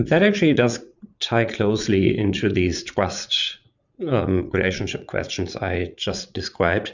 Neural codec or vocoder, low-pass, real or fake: vocoder, 44.1 kHz, 80 mel bands, Vocos; 7.2 kHz; fake